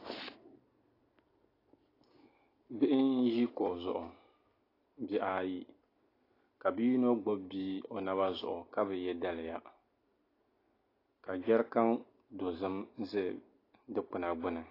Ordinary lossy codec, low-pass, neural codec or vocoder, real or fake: AAC, 24 kbps; 5.4 kHz; none; real